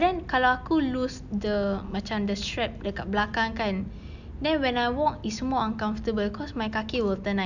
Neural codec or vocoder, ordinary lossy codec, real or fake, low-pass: none; none; real; 7.2 kHz